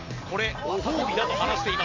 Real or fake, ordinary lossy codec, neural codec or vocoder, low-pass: real; MP3, 48 kbps; none; 7.2 kHz